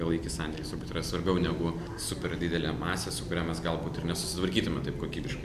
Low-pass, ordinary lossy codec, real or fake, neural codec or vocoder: 14.4 kHz; AAC, 96 kbps; real; none